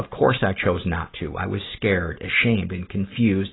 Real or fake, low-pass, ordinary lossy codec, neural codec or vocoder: real; 7.2 kHz; AAC, 16 kbps; none